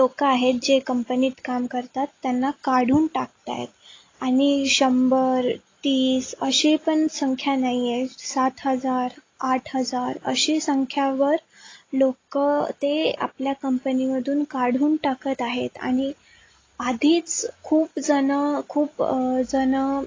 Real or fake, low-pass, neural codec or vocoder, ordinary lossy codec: real; 7.2 kHz; none; AAC, 32 kbps